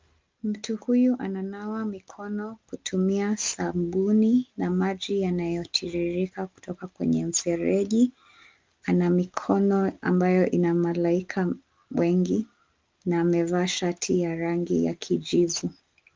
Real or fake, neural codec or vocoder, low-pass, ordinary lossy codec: real; none; 7.2 kHz; Opus, 24 kbps